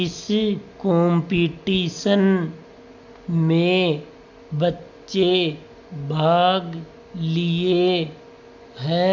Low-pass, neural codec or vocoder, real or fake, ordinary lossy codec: 7.2 kHz; none; real; none